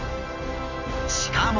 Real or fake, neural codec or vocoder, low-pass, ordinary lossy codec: real; none; 7.2 kHz; none